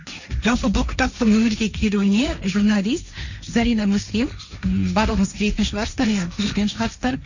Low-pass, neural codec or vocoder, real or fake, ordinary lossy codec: 7.2 kHz; codec, 16 kHz, 1.1 kbps, Voila-Tokenizer; fake; none